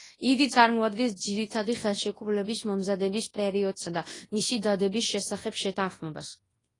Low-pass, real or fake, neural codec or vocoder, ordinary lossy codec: 10.8 kHz; fake; codec, 24 kHz, 0.9 kbps, WavTokenizer, large speech release; AAC, 32 kbps